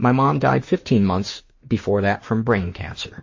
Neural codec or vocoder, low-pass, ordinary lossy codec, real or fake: autoencoder, 48 kHz, 32 numbers a frame, DAC-VAE, trained on Japanese speech; 7.2 kHz; MP3, 32 kbps; fake